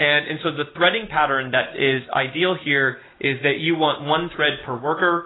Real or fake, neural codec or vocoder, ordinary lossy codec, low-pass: real; none; AAC, 16 kbps; 7.2 kHz